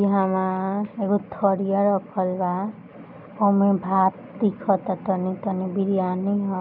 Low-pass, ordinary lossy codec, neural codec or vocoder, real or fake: 5.4 kHz; none; none; real